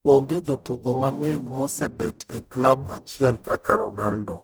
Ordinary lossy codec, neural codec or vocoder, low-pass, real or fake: none; codec, 44.1 kHz, 0.9 kbps, DAC; none; fake